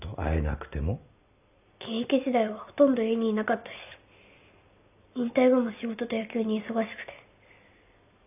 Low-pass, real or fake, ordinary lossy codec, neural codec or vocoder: 3.6 kHz; real; none; none